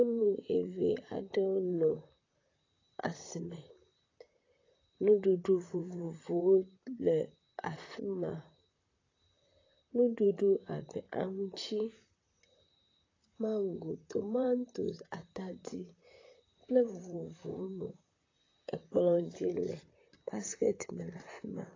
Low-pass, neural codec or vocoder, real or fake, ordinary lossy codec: 7.2 kHz; vocoder, 44.1 kHz, 128 mel bands, Pupu-Vocoder; fake; AAC, 32 kbps